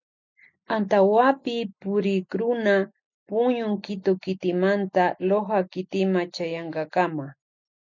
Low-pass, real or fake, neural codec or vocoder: 7.2 kHz; real; none